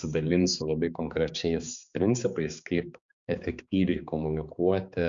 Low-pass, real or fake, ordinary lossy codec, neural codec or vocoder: 7.2 kHz; fake; Opus, 64 kbps; codec, 16 kHz, 4 kbps, X-Codec, HuBERT features, trained on balanced general audio